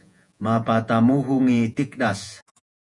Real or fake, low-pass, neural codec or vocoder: fake; 10.8 kHz; vocoder, 48 kHz, 128 mel bands, Vocos